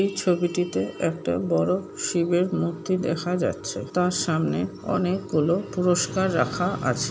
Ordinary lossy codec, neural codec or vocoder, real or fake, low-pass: none; none; real; none